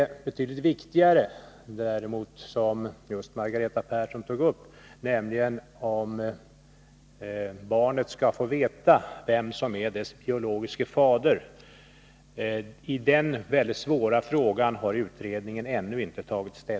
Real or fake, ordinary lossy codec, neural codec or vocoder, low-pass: real; none; none; none